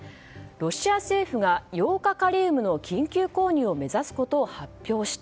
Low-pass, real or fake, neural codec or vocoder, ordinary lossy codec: none; real; none; none